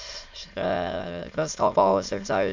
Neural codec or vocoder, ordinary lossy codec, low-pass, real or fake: autoencoder, 22.05 kHz, a latent of 192 numbers a frame, VITS, trained on many speakers; MP3, 64 kbps; 7.2 kHz; fake